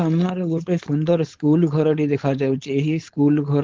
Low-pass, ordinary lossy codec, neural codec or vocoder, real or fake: 7.2 kHz; Opus, 16 kbps; codec, 16 kHz, 4.8 kbps, FACodec; fake